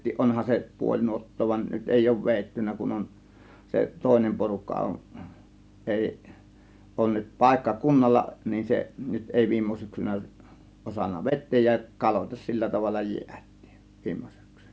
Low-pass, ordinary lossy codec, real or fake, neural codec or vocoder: none; none; real; none